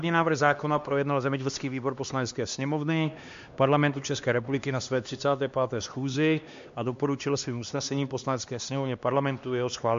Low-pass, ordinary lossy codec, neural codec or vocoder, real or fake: 7.2 kHz; MP3, 48 kbps; codec, 16 kHz, 2 kbps, X-Codec, HuBERT features, trained on LibriSpeech; fake